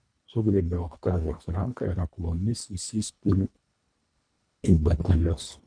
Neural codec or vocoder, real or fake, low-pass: codec, 24 kHz, 1.5 kbps, HILCodec; fake; 9.9 kHz